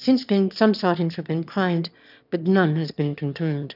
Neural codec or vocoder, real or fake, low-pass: autoencoder, 22.05 kHz, a latent of 192 numbers a frame, VITS, trained on one speaker; fake; 5.4 kHz